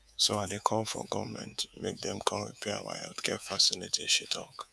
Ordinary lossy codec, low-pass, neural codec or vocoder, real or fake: none; none; codec, 24 kHz, 3.1 kbps, DualCodec; fake